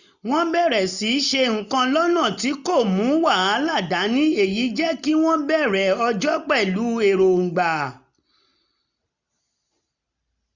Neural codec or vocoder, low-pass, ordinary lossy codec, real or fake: none; 7.2 kHz; none; real